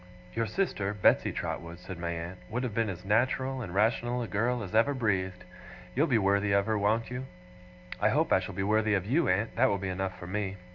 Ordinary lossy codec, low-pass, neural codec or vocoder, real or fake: MP3, 48 kbps; 7.2 kHz; none; real